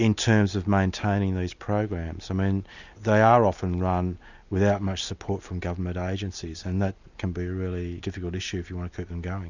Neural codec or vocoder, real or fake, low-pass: none; real; 7.2 kHz